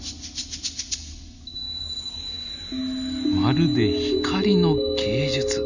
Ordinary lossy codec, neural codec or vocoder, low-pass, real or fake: none; none; 7.2 kHz; real